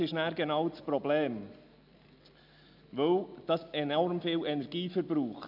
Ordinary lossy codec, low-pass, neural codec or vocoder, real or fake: none; 5.4 kHz; none; real